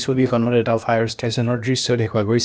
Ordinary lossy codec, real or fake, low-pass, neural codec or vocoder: none; fake; none; codec, 16 kHz, 0.8 kbps, ZipCodec